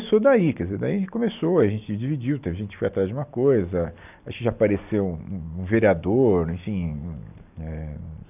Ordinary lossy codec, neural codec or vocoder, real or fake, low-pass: none; none; real; 3.6 kHz